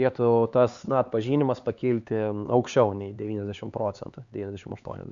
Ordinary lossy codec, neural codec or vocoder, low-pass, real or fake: Opus, 64 kbps; codec, 16 kHz, 4 kbps, X-Codec, HuBERT features, trained on LibriSpeech; 7.2 kHz; fake